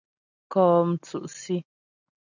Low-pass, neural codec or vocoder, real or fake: 7.2 kHz; none; real